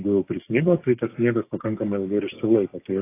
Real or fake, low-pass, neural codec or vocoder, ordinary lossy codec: fake; 3.6 kHz; codec, 16 kHz, 6 kbps, DAC; AAC, 24 kbps